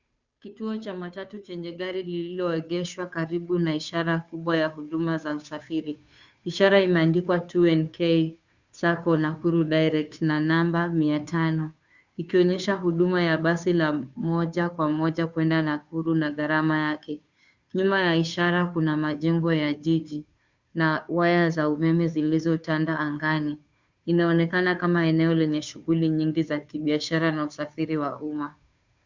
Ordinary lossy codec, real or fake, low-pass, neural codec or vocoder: Opus, 64 kbps; fake; 7.2 kHz; codec, 16 kHz, 2 kbps, FunCodec, trained on Chinese and English, 25 frames a second